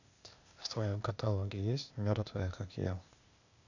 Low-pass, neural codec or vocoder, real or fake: 7.2 kHz; codec, 16 kHz, 0.8 kbps, ZipCodec; fake